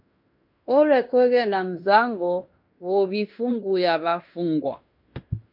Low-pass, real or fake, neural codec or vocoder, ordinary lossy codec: 5.4 kHz; fake; codec, 16 kHz in and 24 kHz out, 0.9 kbps, LongCat-Audio-Codec, fine tuned four codebook decoder; MP3, 48 kbps